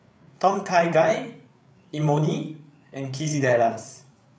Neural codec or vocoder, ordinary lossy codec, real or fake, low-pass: codec, 16 kHz, 8 kbps, FreqCodec, larger model; none; fake; none